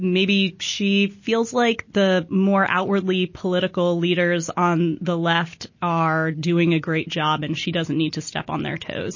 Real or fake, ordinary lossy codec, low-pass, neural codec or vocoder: real; MP3, 32 kbps; 7.2 kHz; none